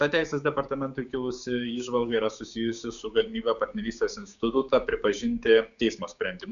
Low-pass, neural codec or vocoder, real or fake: 7.2 kHz; codec, 16 kHz, 6 kbps, DAC; fake